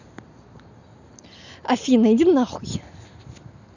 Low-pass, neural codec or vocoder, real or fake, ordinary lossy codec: 7.2 kHz; none; real; none